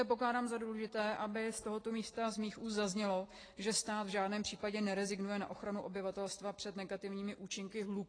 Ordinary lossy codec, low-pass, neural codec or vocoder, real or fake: AAC, 32 kbps; 9.9 kHz; none; real